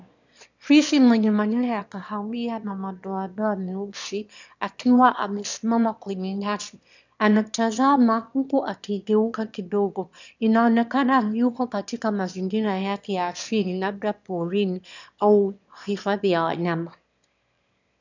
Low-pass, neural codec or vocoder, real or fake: 7.2 kHz; autoencoder, 22.05 kHz, a latent of 192 numbers a frame, VITS, trained on one speaker; fake